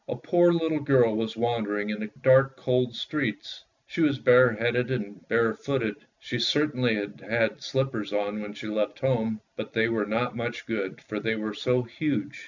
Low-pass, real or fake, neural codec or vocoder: 7.2 kHz; real; none